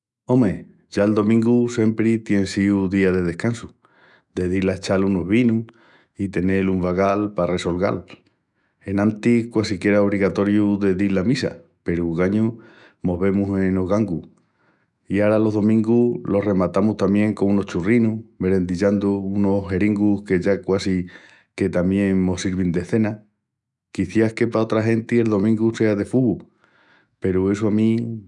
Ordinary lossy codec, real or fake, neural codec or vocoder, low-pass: none; fake; autoencoder, 48 kHz, 128 numbers a frame, DAC-VAE, trained on Japanese speech; 10.8 kHz